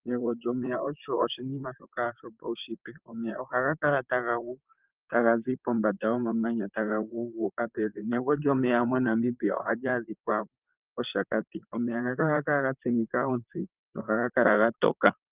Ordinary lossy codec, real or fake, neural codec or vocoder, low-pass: Opus, 24 kbps; fake; vocoder, 44.1 kHz, 128 mel bands, Pupu-Vocoder; 3.6 kHz